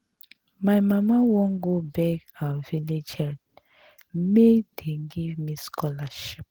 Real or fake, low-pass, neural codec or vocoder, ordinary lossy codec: real; 19.8 kHz; none; Opus, 16 kbps